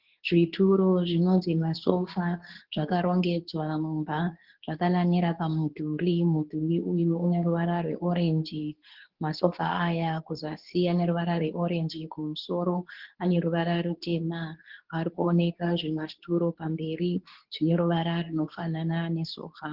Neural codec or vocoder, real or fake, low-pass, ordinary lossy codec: codec, 24 kHz, 0.9 kbps, WavTokenizer, medium speech release version 2; fake; 5.4 kHz; Opus, 16 kbps